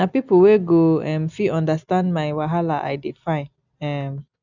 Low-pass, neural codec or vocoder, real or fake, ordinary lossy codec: 7.2 kHz; none; real; none